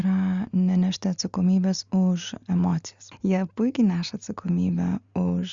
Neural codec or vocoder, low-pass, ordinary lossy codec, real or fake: none; 7.2 kHz; Opus, 64 kbps; real